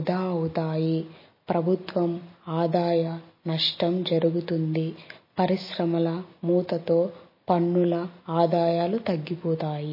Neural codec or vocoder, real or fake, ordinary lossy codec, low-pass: none; real; MP3, 24 kbps; 5.4 kHz